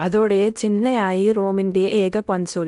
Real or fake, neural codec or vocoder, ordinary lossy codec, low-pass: fake; codec, 16 kHz in and 24 kHz out, 0.8 kbps, FocalCodec, streaming, 65536 codes; none; 10.8 kHz